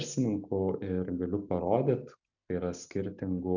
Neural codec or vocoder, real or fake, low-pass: none; real; 7.2 kHz